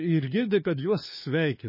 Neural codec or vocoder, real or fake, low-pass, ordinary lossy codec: codec, 16 kHz, 4 kbps, FunCodec, trained on LibriTTS, 50 frames a second; fake; 5.4 kHz; MP3, 24 kbps